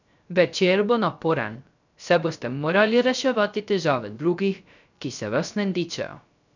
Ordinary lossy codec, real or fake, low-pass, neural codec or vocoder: none; fake; 7.2 kHz; codec, 16 kHz, 0.3 kbps, FocalCodec